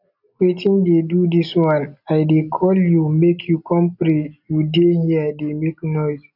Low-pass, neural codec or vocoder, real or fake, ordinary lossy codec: 5.4 kHz; none; real; none